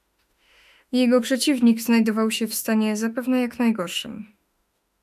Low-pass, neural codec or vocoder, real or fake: 14.4 kHz; autoencoder, 48 kHz, 32 numbers a frame, DAC-VAE, trained on Japanese speech; fake